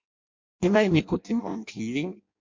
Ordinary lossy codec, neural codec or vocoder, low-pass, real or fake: MP3, 48 kbps; codec, 16 kHz in and 24 kHz out, 0.6 kbps, FireRedTTS-2 codec; 7.2 kHz; fake